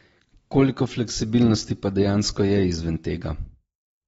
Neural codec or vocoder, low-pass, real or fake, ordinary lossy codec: none; 19.8 kHz; real; AAC, 24 kbps